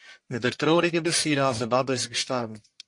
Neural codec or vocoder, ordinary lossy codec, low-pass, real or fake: codec, 44.1 kHz, 1.7 kbps, Pupu-Codec; MP3, 48 kbps; 10.8 kHz; fake